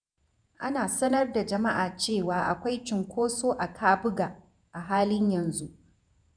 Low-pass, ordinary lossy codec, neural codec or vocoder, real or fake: 9.9 kHz; none; vocoder, 48 kHz, 128 mel bands, Vocos; fake